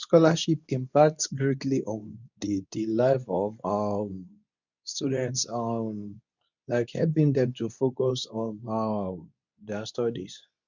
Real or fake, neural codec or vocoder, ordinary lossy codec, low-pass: fake; codec, 24 kHz, 0.9 kbps, WavTokenizer, medium speech release version 2; none; 7.2 kHz